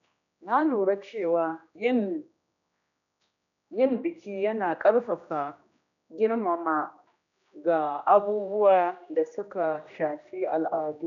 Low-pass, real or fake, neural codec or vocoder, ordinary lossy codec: 7.2 kHz; fake; codec, 16 kHz, 1 kbps, X-Codec, HuBERT features, trained on general audio; none